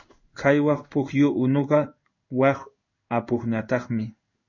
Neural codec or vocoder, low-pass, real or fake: codec, 16 kHz in and 24 kHz out, 1 kbps, XY-Tokenizer; 7.2 kHz; fake